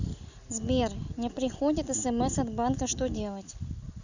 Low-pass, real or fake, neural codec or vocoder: 7.2 kHz; real; none